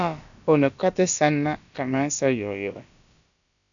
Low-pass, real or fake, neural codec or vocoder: 7.2 kHz; fake; codec, 16 kHz, about 1 kbps, DyCAST, with the encoder's durations